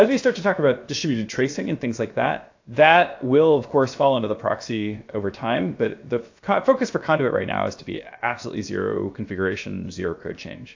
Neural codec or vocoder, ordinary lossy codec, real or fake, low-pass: codec, 16 kHz, about 1 kbps, DyCAST, with the encoder's durations; AAC, 48 kbps; fake; 7.2 kHz